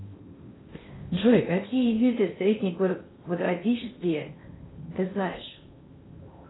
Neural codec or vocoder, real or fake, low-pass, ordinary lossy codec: codec, 16 kHz in and 24 kHz out, 0.6 kbps, FocalCodec, streaming, 4096 codes; fake; 7.2 kHz; AAC, 16 kbps